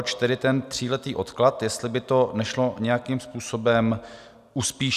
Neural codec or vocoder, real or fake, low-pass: none; real; 14.4 kHz